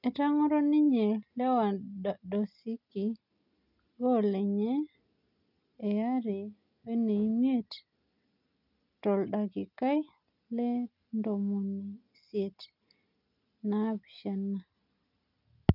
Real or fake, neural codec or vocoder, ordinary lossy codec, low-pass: real; none; none; 5.4 kHz